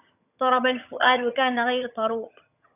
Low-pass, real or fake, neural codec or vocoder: 3.6 kHz; fake; vocoder, 22.05 kHz, 80 mel bands, HiFi-GAN